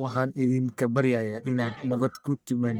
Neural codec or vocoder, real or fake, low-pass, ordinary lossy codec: codec, 44.1 kHz, 1.7 kbps, Pupu-Codec; fake; none; none